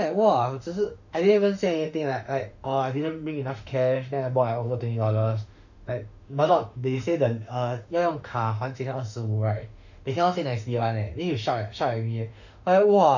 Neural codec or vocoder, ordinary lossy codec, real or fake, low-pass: autoencoder, 48 kHz, 32 numbers a frame, DAC-VAE, trained on Japanese speech; none; fake; 7.2 kHz